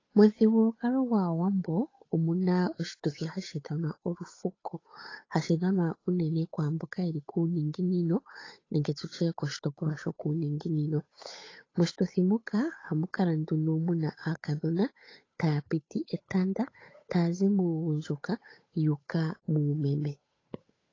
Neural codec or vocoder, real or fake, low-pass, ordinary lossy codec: codec, 16 kHz, 8 kbps, FunCodec, trained on Chinese and English, 25 frames a second; fake; 7.2 kHz; AAC, 32 kbps